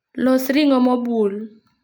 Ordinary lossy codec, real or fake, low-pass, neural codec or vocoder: none; real; none; none